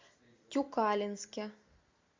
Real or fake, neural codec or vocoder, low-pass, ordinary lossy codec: real; none; 7.2 kHz; MP3, 64 kbps